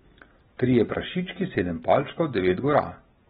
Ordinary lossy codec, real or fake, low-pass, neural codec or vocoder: AAC, 16 kbps; real; 19.8 kHz; none